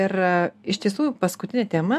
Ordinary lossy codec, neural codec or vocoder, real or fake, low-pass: AAC, 96 kbps; none; real; 14.4 kHz